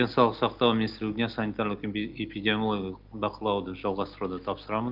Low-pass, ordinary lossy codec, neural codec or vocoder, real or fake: 5.4 kHz; Opus, 32 kbps; none; real